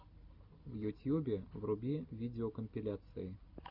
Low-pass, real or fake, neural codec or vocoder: 5.4 kHz; real; none